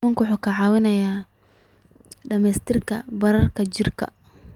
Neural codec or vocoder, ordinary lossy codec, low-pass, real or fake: none; Opus, 32 kbps; 19.8 kHz; real